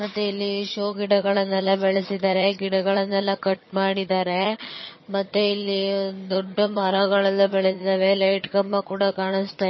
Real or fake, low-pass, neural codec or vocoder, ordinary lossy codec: fake; 7.2 kHz; vocoder, 22.05 kHz, 80 mel bands, HiFi-GAN; MP3, 24 kbps